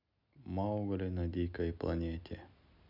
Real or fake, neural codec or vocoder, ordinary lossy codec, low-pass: real; none; none; 5.4 kHz